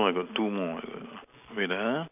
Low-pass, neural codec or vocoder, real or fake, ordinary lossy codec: 3.6 kHz; none; real; none